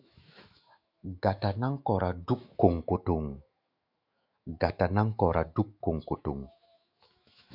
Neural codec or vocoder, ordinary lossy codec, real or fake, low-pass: autoencoder, 48 kHz, 128 numbers a frame, DAC-VAE, trained on Japanese speech; AAC, 48 kbps; fake; 5.4 kHz